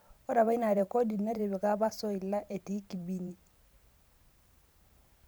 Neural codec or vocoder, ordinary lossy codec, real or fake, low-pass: vocoder, 44.1 kHz, 128 mel bands every 512 samples, BigVGAN v2; none; fake; none